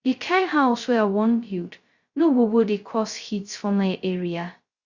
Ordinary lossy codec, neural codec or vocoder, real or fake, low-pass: Opus, 64 kbps; codec, 16 kHz, 0.2 kbps, FocalCodec; fake; 7.2 kHz